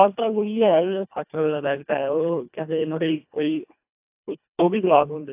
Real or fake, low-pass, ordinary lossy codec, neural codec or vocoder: fake; 3.6 kHz; AAC, 32 kbps; codec, 24 kHz, 1.5 kbps, HILCodec